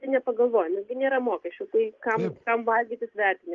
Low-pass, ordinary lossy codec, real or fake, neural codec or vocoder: 7.2 kHz; Opus, 32 kbps; real; none